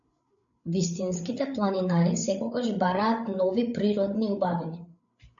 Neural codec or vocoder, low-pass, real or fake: codec, 16 kHz, 8 kbps, FreqCodec, larger model; 7.2 kHz; fake